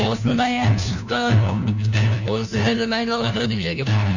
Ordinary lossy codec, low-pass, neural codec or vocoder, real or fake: none; 7.2 kHz; codec, 16 kHz, 1 kbps, FunCodec, trained on LibriTTS, 50 frames a second; fake